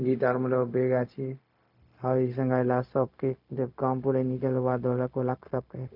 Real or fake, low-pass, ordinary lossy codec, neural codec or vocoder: fake; 5.4 kHz; none; codec, 16 kHz, 0.4 kbps, LongCat-Audio-Codec